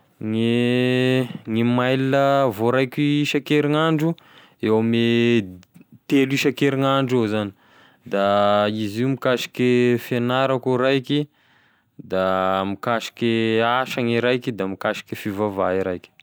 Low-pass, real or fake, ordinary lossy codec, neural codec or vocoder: none; real; none; none